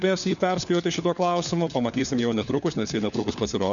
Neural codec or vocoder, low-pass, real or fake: codec, 16 kHz, 4 kbps, FunCodec, trained on LibriTTS, 50 frames a second; 7.2 kHz; fake